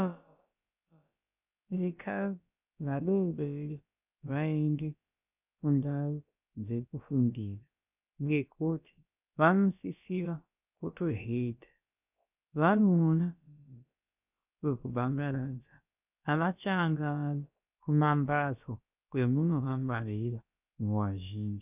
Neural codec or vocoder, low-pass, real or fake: codec, 16 kHz, about 1 kbps, DyCAST, with the encoder's durations; 3.6 kHz; fake